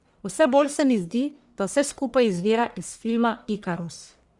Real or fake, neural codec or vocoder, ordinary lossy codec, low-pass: fake; codec, 44.1 kHz, 1.7 kbps, Pupu-Codec; Opus, 64 kbps; 10.8 kHz